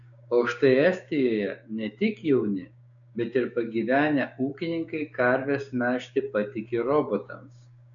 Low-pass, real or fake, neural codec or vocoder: 7.2 kHz; fake; codec, 16 kHz, 6 kbps, DAC